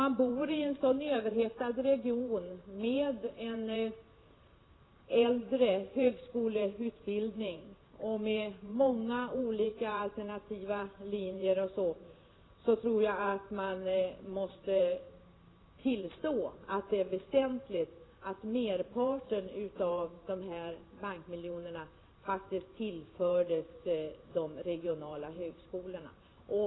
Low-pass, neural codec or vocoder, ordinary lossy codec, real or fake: 7.2 kHz; vocoder, 44.1 kHz, 128 mel bands every 512 samples, BigVGAN v2; AAC, 16 kbps; fake